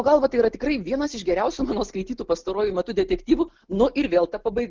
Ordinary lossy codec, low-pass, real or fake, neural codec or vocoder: Opus, 16 kbps; 7.2 kHz; real; none